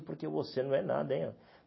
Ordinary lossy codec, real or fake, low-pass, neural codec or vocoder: MP3, 24 kbps; real; 7.2 kHz; none